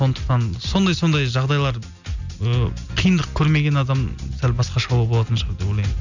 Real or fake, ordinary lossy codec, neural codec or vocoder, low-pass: real; none; none; 7.2 kHz